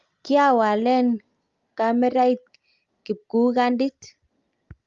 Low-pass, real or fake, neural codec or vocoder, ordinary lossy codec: 7.2 kHz; real; none; Opus, 32 kbps